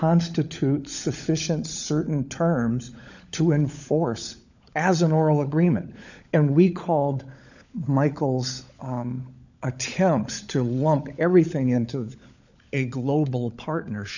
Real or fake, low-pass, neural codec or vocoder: fake; 7.2 kHz; codec, 16 kHz, 16 kbps, FunCodec, trained on LibriTTS, 50 frames a second